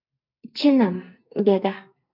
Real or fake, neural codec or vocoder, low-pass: fake; codec, 44.1 kHz, 2.6 kbps, SNAC; 5.4 kHz